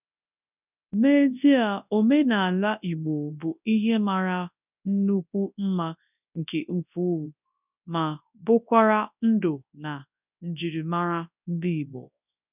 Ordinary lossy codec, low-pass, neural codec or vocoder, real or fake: none; 3.6 kHz; codec, 24 kHz, 0.9 kbps, WavTokenizer, large speech release; fake